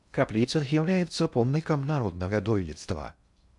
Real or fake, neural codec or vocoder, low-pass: fake; codec, 16 kHz in and 24 kHz out, 0.6 kbps, FocalCodec, streaming, 2048 codes; 10.8 kHz